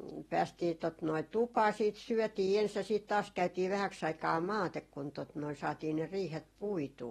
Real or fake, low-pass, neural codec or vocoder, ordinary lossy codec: real; 19.8 kHz; none; AAC, 32 kbps